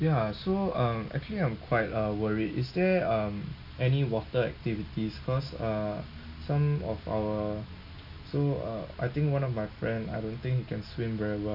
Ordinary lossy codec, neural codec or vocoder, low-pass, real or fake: none; none; 5.4 kHz; real